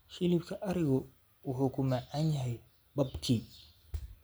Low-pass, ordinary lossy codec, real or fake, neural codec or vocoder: none; none; real; none